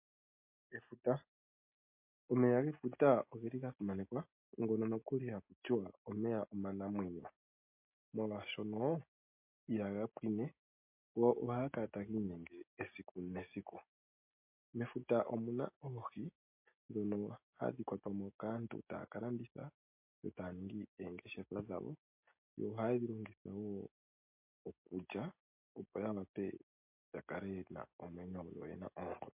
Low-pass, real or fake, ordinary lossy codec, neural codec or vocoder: 3.6 kHz; real; MP3, 32 kbps; none